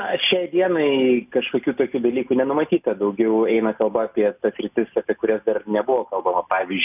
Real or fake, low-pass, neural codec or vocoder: real; 3.6 kHz; none